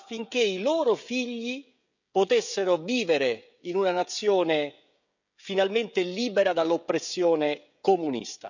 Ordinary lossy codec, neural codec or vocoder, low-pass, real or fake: none; codec, 16 kHz, 16 kbps, FreqCodec, smaller model; 7.2 kHz; fake